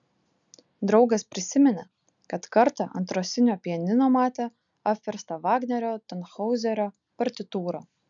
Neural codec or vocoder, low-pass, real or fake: none; 7.2 kHz; real